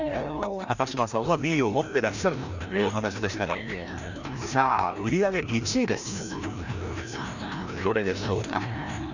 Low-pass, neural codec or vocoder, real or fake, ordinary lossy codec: 7.2 kHz; codec, 16 kHz, 1 kbps, FreqCodec, larger model; fake; none